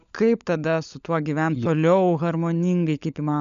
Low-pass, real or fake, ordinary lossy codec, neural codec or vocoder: 7.2 kHz; fake; MP3, 96 kbps; codec, 16 kHz, 16 kbps, FunCodec, trained on LibriTTS, 50 frames a second